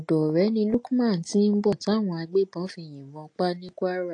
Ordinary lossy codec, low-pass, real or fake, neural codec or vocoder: AAC, 48 kbps; 9.9 kHz; real; none